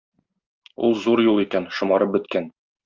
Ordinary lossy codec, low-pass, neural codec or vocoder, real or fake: Opus, 24 kbps; 7.2 kHz; none; real